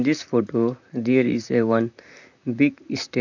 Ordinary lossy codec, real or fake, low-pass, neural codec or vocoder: none; fake; 7.2 kHz; vocoder, 44.1 kHz, 128 mel bands, Pupu-Vocoder